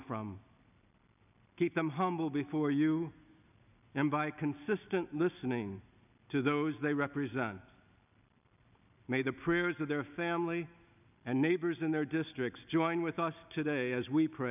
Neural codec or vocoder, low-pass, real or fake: none; 3.6 kHz; real